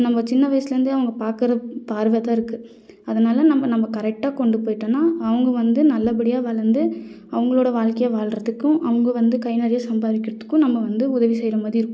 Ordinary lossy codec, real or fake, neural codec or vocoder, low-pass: none; real; none; none